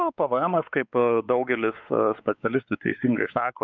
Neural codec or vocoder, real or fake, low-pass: codec, 16 kHz, 4 kbps, X-Codec, WavLM features, trained on Multilingual LibriSpeech; fake; 7.2 kHz